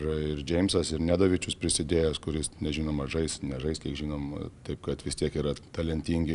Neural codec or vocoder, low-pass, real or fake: none; 10.8 kHz; real